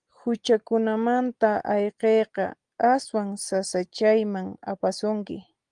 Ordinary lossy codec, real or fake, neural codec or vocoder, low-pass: Opus, 24 kbps; real; none; 9.9 kHz